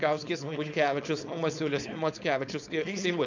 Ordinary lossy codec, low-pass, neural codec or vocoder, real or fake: MP3, 64 kbps; 7.2 kHz; codec, 16 kHz, 4.8 kbps, FACodec; fake